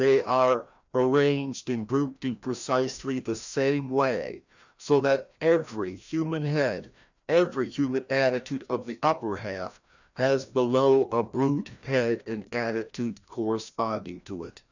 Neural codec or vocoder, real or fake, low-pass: codec, 16 kHz, 1 kbps, FreqCodec, larger model; fake; 7.2 kHz